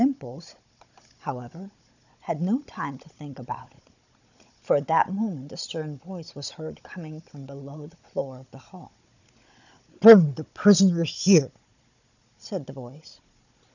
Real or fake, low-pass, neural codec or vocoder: fake; 7.2 kHz; codec, 16 kHz, 16 kbps, FunCodec, trained on Chinese and English, 50 frames a second